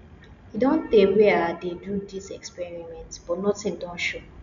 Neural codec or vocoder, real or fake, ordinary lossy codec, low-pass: none; real; none; 7.2 kHz